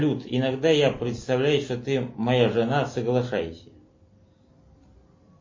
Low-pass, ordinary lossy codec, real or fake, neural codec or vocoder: 7.2 kHz; MP3, 32 kbps; real; none